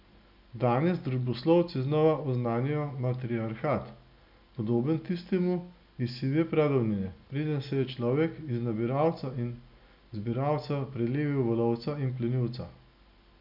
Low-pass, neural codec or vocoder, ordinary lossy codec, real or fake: 5.4 kHz; none; none; real